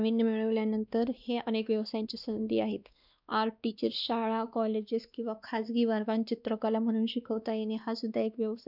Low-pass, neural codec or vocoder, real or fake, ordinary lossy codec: 5.4 kHz; codec, 16 kHz, 2 kbps, X-Codec, WavLM features, trained on Multilingual LibriSpeech; fake; none